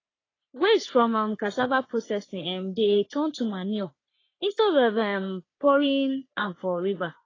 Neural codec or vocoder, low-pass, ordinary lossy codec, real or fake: codec, 44.1 kHz, 3.4 kbps, Pupu-Codec; 7.2 kHz; AAC, 32 kbps; fake